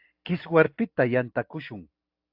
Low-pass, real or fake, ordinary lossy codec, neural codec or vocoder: 5.4 kHz; real; AAC, 48 kbps; none